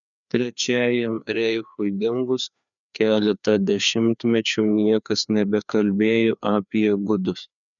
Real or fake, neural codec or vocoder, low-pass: fake; codec, 16 kHz, 2 kbps, FreqCodec, larger model; 7.2 kHz